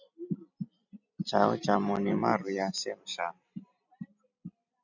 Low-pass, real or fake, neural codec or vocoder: 7.2 kHz; fake; vocoder, 44.1 kHz, 128 mel bands every 256 samples, BigVGAN v2